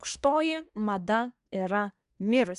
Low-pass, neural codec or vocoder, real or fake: 10.8 kHz; codec, 24 kHz, 1 kbps, SNAC; fake